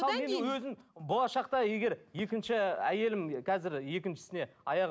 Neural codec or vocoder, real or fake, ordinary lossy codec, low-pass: none; real; none; none